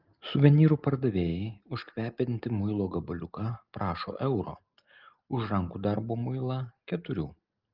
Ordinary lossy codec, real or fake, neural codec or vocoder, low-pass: Opus, 32 kbps; real; none; 5.4 kHz